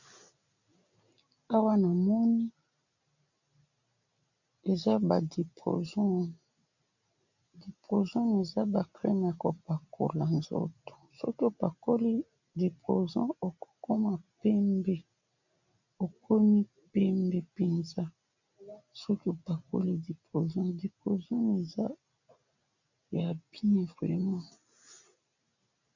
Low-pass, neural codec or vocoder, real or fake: 7.2 kHz; none; real